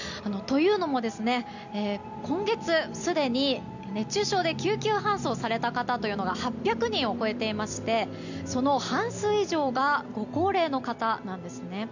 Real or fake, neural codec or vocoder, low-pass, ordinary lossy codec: real; none; 7.2 kHz; none